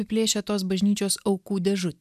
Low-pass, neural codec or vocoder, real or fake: 14.4 kHz; none; real